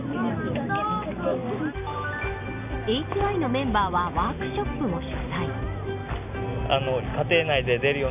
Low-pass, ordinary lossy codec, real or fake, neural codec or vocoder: 3.6 kHz; none; real; none